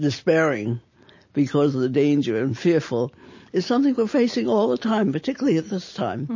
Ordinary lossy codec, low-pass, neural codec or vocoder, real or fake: MP3, 32 kbps; 7.2 kHz; none; real